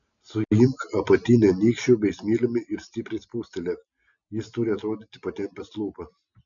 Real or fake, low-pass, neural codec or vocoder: real; 7.2 kHz; none